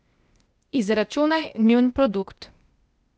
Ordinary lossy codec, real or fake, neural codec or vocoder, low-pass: none; fake; codec, 16 kHz, 0.8 kbps, ZipCodec; none